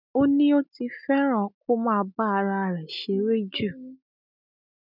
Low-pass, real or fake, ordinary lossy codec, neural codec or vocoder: 5.4 kHz; real; none; none